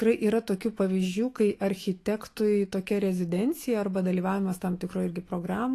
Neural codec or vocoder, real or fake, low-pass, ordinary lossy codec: autoencoder, 48 kHz, 128 numbers a frame, DAC-VAE, trained on Japanese speech; fake; 14.4 kHz; AAC, 48 kbps